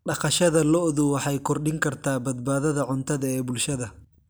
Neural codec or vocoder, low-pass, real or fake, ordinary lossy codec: none; none; real; none